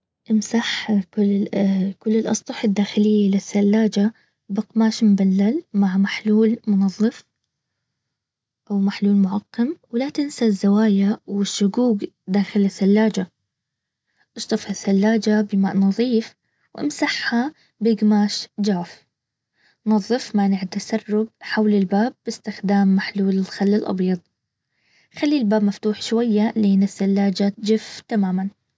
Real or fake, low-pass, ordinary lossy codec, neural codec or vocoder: real; none; none; none